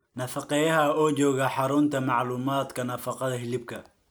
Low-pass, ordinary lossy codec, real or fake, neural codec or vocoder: none; none; real; none